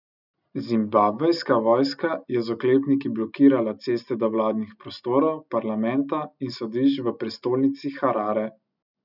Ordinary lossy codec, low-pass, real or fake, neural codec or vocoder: none; 5.4 kHz; real; none